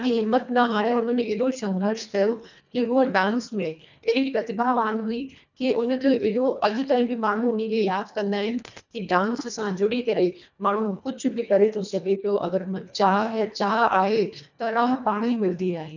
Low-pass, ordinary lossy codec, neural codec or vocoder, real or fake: 7.2 kHz; none; codec, 24 kHz, 1.5 kbps, HILCodec; fake